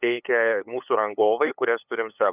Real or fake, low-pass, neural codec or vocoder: fake; 3.6 kHz; codec, 16 kHz, 8 kbps, FunCodec, trained on LibriTTS, 25 frames a second